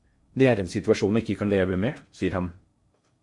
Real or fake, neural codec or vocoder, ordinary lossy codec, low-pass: fake; codec, 16 kHz in and 24 kHz out, 0.6 kbps, FocalCodec, streaming, 2048 codes; MP3, 48 kbps; 10.8 kHz